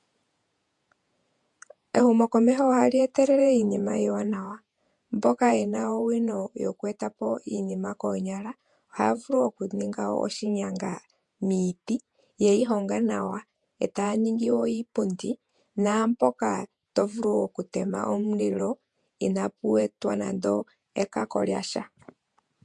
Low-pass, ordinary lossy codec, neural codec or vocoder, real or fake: 10.8 kHz; MP3, 64 kbps; vocoder, 48 kHz, 128 mel bands, Vocos; fake